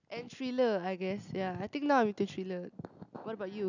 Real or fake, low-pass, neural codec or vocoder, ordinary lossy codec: real; 7.2 kHz; none; none